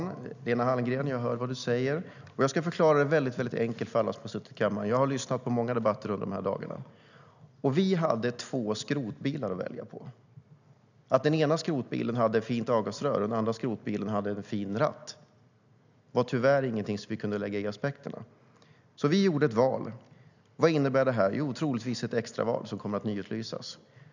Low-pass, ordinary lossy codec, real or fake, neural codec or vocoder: 7.2 kHz; none; real; none